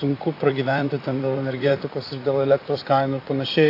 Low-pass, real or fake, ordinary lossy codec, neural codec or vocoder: 5.4 kHz; fake; AAC, 32 kbps; vocoder, 44.1 kHz, 128 mel bands, Pupu-Vocoder